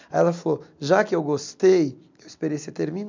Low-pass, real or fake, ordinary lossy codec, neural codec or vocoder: 7.2 kHz; real; MP3, 64 kbps; none